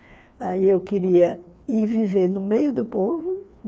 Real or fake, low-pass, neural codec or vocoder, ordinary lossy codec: fake; none; codec, 16 kHz, 2 kbps, FreqCodec, larger model; none